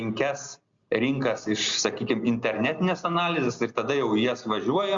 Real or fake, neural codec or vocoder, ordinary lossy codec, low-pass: real; none; Opus, 64 kbps; 7.2 kHz